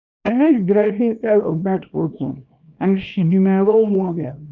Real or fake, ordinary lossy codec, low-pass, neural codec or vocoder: fake; none; 7.2 kHz; codec, 24 kHz, 0.9 kbps, WavTokenizer, small release